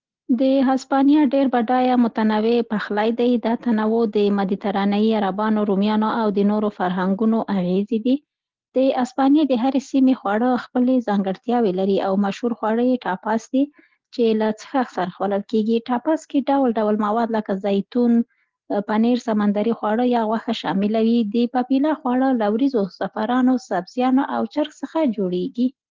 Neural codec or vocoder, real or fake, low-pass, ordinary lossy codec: none; real; 7.2 kHz; Opus, 16 kbps